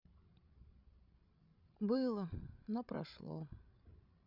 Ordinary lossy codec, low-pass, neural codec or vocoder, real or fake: none; 5.4 kHz; codec, 16 kHz, 16 kbps, FreqCodec, larger model; fake